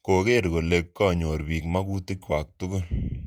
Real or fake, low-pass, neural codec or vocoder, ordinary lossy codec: fake; 19.8 kHz; vocoder, 48 kHz, 128 mel bands, Vocos; none